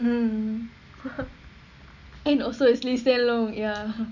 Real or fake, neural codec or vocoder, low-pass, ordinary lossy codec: real; none; 7.2 kHz; none